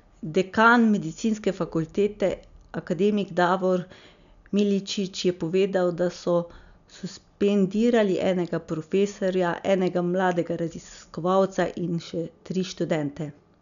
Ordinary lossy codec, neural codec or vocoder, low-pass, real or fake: none; none; 7.2 kHz; real